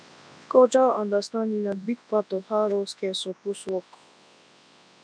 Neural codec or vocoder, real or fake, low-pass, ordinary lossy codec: codec, 24 kHz, 0.9 kbps, WavTokenizer, large speech release; fake; 9.9 kHz; none